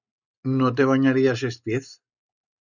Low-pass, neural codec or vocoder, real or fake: 7.2 kHz; none; real